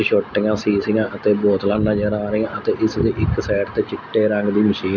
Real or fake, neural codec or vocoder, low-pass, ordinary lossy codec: real; none; 7.2 kHz; none